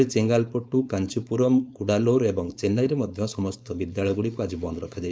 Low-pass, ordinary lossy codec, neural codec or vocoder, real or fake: none; none; codec, 16 kHz, 4.8 kbps, FACodec; fake